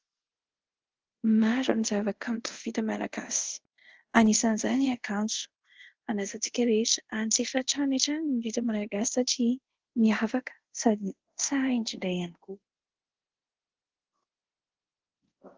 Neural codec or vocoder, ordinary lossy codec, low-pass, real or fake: codec, 24 kHz, 0.5 kbps, DualCodec; Opus, 16 kbps; 7.2 kHz; fake